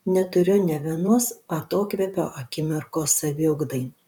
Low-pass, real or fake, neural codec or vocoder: 19.8 kHz; fake; vocoder, 44.1 kHz, 128 mel bands, Pupu-Vocoder